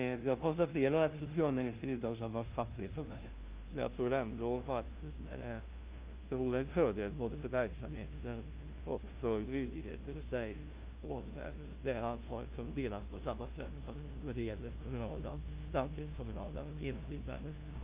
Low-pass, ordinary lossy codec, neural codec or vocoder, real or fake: 3.6 kHz; Opus, 24 kbps; codec, 16 kHz, 0.5 kbps, FunCodec, trained on LibriTTS, 25 frames a second; fake